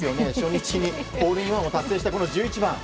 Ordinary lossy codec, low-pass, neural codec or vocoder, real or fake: none; none; none; real